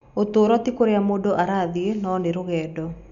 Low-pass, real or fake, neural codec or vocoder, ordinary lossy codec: 7.2 kHz; real; none; none